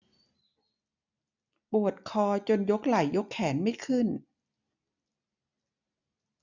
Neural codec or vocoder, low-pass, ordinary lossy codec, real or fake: none; 7.2 kHz; none; real